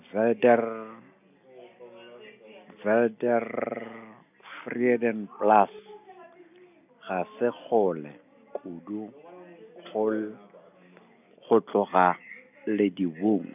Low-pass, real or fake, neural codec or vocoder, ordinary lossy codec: 3.6 kHz; real; none; none